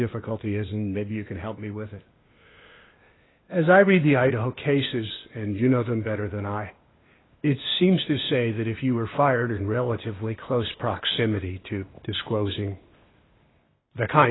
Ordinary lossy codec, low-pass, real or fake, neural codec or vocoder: AAC, 16 kbps; 7.2 kHz; fake; codec, 16 kHz, 0.8 kbps, ZipCodec